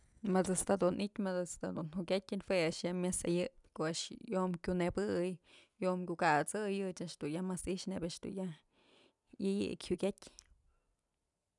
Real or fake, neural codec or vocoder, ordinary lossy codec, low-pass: real; none; none; 10.8 kHz